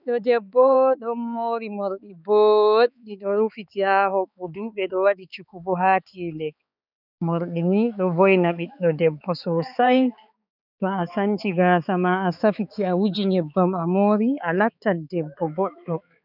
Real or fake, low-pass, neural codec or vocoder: fake; 5.4 kHz; codec, 16 kHz, 4 kbps, X-Codec, HuBERT features, trained on balanced general audio